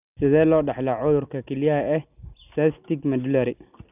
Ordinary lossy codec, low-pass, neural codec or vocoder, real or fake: none; 3.6 kHz; none; real